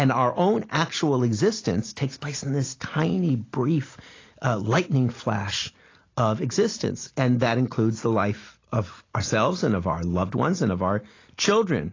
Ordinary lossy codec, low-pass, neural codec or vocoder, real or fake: AAC, 32 kbps; 7.2 kHz; none; real